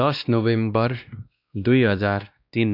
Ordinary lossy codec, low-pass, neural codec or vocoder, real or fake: none; 5.4 kHz; codec, 16 kHz, 1 kbps, X-Codec, WavLM features, trained on Multilingual LibriSpeech; fake